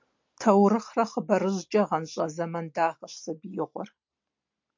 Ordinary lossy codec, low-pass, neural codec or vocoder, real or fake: MP3, 48 kbps; 7.2 kHz; none; real